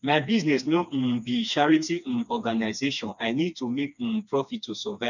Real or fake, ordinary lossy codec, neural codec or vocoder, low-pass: fake; none; codec, 16 kHz, 2 kbps, FreqCodec, smaller model; 7.2 kHz